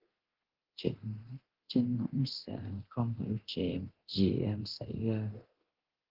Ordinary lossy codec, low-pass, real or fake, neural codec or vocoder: Opus, 16 kbps; 5.4 kHz; fake; codec, 24 kHz, 0.9 kbps, DualCodec